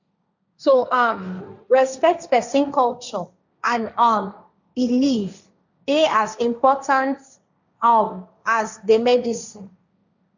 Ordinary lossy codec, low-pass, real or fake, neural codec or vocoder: none; 7.2 kHz; fake; codec, 16 kHz, 1.1 kbps, Voila-Tokenizer